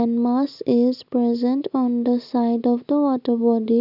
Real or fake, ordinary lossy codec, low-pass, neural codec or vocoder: real; none; 5.4 kHz; none